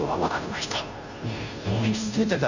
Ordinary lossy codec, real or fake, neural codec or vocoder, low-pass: none; fake; codec, 16 kHz, 0.5 kbps, FunCodec, trained on Chinese and English, 25 frames a second; 7.2 kHz